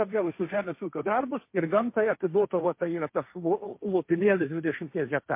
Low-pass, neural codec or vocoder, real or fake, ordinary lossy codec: 3.6 kHz; codec, 16 kHz, 1.1 kbps, Voila-Tokenizer; fake; MP3, 24 kbps